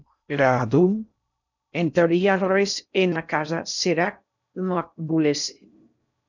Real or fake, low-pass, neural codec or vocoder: fake; 7.2 kHz; codec, 16 kHz in and 24 kHz out, 0.6 kbps, FocalCodec, streaming, 4096 codes